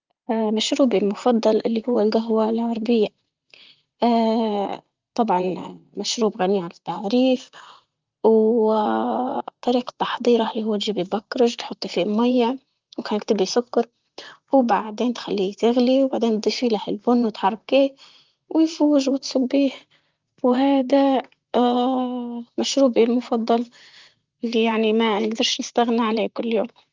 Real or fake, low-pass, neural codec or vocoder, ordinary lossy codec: fake; 7.2 kHz; vocoder, 44.1 kHz, 128 mel bands, Pupu-Vocoder; Opus, 32 kbps